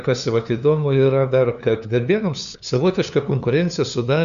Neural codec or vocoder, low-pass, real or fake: codec, 16 kHz, 2 kbps, FunCodec, trained on LibriTTS, 25 frames a second; 7.2 kHz; fake